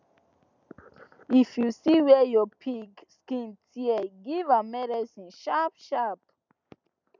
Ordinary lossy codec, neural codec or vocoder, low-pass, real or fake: none; none; 7.2 kHz; real